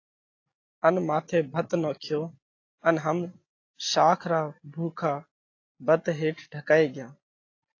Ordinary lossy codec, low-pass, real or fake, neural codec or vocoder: AAC, 32 kbps; 7.2 kHz; real; none